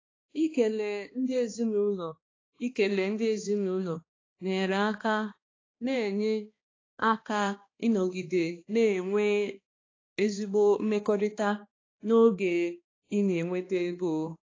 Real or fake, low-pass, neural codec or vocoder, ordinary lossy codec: fake; 7.2 kHz; codec, 16 kHz, 2 kbps, X-Codec, HuBERT features, trained on balanced general audio; AAC, 32 kbps